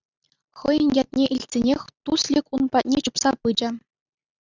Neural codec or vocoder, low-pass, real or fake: none; 7.2 kHz; real